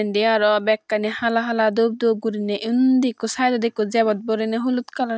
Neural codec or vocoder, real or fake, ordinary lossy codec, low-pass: none; real; none; none